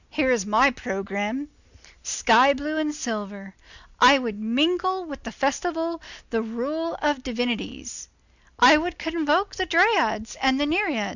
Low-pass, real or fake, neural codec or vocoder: 7.2 kHz; real; none